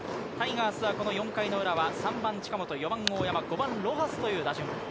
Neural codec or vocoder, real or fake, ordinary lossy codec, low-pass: none; real; none; none